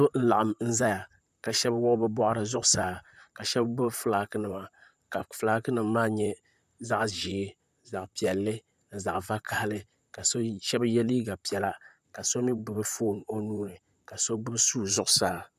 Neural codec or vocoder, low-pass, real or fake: vocoder, 44.1 kHz, 128 mel bands, Pupu-Vocoder; 14.4 kHz; fake